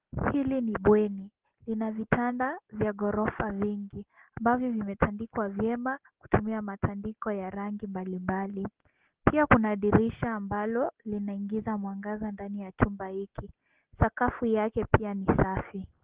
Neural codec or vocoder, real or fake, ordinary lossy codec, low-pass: none; real; Opus, 16 kbps; 3.6 kHz